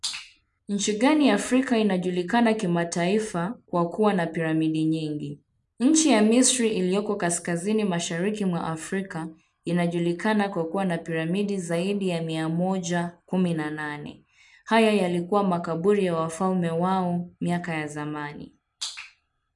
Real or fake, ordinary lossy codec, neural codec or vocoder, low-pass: real; none; none; 10.8 kHz